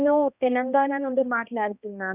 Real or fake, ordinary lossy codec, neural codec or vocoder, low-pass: fake; none; codec, 16 kHz, 1 kbps, X-Codec, HuBERT features, trained on balanced general audio; 3.6 kHz